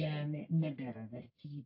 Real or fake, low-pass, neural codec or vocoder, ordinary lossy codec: fake; 5.4 kHz; codec, 44.1 kHz, 1.7 kbps, Pupu-Codec; AAC, 48 kbps